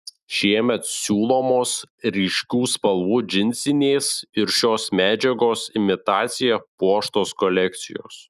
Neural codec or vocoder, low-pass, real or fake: none; 14.4 kHz; real